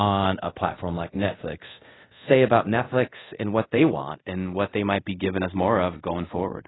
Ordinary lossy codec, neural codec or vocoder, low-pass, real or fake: AAC, 16 kbps; codec, 24 kHz, 0.5 kbps, DualCodec; 7.2 kHz; fake